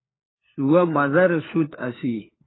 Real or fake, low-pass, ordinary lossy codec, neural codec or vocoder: fake; 7.2 kHz; AAC, 16 kbps; codec, 16 kHz, 4 kbps, FunCodec, trained on LibriTTS, 50 frames a second